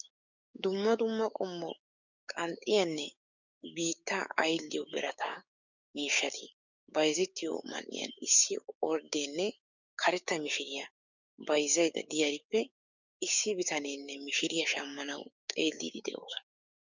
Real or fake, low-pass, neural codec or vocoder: fake; 7.2 kHz; codec, 16 kHz, 6 kbps, DAC